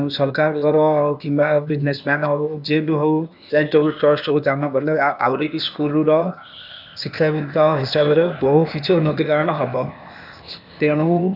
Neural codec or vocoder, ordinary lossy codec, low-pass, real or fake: codec, 16 kHz, 0.8 kbps, ZipCodec; none; 5.4 kHz; fake